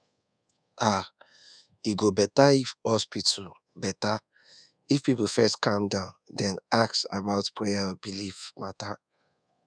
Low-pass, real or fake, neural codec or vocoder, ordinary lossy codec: 9.9 kHz; fake; codec, 24 kHz, 1.2 kbps, DualCodec; none